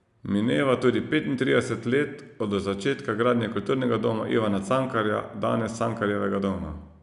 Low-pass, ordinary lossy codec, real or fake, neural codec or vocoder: 10.8 kHz; none; real; none